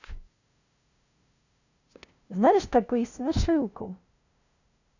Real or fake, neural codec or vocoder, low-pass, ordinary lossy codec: fake; codec, 16 kHz, 0.5 kbps, FunCodec, trained on LibriTTS, 25 frames a second; 7.2 kHz; none